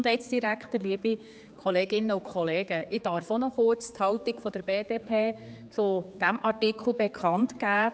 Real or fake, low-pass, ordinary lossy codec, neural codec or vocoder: fake; none; none; codec, 16 kHz, 4 kbps, X-Codec, HuBERT features, trained on general audio